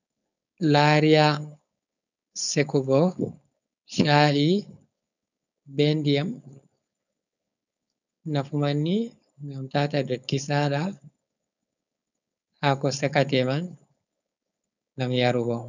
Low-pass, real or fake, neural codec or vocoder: 7.2 kHz; fake; codec, 16 kHz, 4.8 kbps, FACodec